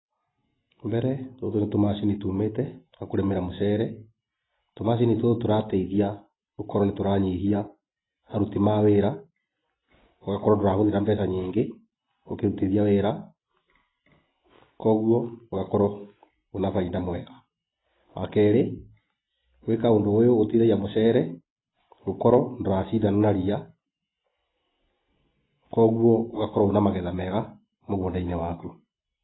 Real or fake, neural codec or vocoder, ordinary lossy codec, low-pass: real; none; AAC, 16 kbps; 7.2 kHz